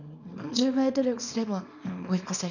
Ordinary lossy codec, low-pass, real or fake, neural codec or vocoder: none; 7.2 kHz; fake; codec, 24 kHz, 0.9 kbps, WavTokenizer, small release